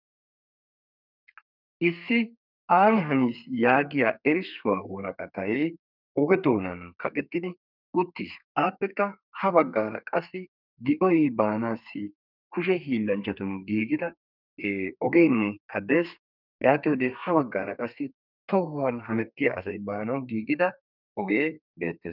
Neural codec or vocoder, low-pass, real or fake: codec, 32 kHz, 1.9 kbps, SNAC; 5.4 kHz; fake